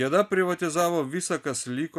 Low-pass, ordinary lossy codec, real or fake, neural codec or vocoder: 14.4 kHz; AAC, 96 kbps; real; none